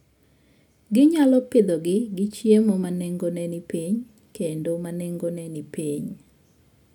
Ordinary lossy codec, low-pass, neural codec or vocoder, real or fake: none; 19.8 kHz; none; real